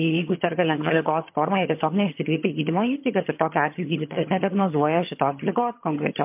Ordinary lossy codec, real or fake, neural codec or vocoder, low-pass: MP3, 32 kbps; fake; vocoder, 22.05 kHz, 80 mel bands, HiFi-GAN; 3.6 kHz